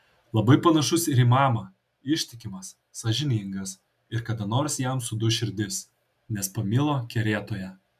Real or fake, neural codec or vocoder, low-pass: real; none; 14.4 kHz